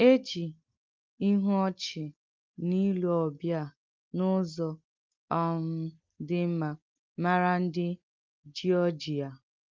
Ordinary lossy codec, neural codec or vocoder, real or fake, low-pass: Opus, 32 kbps; none; real; 7.2 kHz